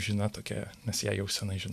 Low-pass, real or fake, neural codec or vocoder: 14.4 kHz; real; none